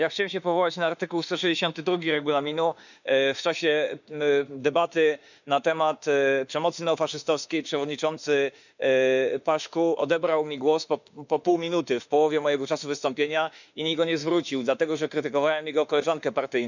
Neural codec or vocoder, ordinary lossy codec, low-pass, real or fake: autoencoder, 48 kHz, 32 numbers a frame, DAC-VAE, trained on Japanese speech; none; 7.2 kHz; fake